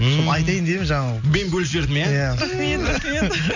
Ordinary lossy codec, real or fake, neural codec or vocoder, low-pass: none; real; none; 7.2 kHz